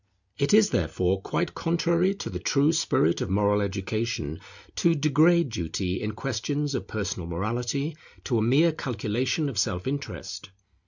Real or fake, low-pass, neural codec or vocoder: real; 7.2 kHz; none